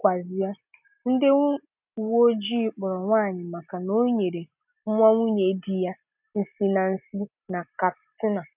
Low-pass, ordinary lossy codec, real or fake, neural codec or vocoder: 3.6 kHz; none; real; none